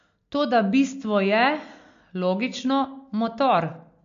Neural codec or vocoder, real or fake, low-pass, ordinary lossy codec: none; real; 7.2 kHz; MP3, 48 kbps